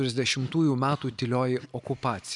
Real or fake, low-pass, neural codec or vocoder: real; 10.8 kHz; none